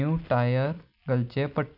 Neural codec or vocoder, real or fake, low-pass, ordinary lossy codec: none; real; 5.4 kHz; none